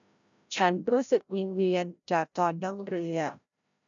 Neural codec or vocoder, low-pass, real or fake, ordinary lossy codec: codec, 16 kHz, 0.5 kbps, FreqCodec, larger model; 7.2 kHz; fake; none